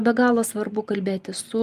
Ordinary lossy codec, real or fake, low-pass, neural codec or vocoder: Opus, 32 kbps; real; 14.4 kHz; none